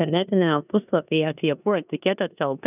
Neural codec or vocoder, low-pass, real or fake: codec, 16 kHz in and 24 kHz out, 0.9 kbps, LongCat-Audio-Codec, four codebook decoder; 3.6 kHz; fake